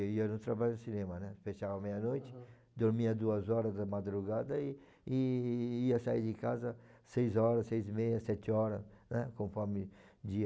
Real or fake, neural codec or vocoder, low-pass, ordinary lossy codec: real; none; none; none